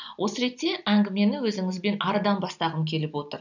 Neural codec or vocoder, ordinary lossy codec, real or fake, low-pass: none; none; real; 7.2 kHz